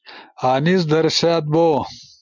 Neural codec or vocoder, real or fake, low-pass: none; real; 7.2 kHz